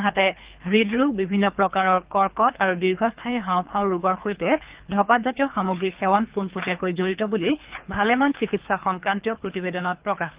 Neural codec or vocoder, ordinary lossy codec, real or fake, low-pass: codec, 24 kHz, 3 kbps, HILCodec; Opus, 32 kbps; fake; 3.6 kHz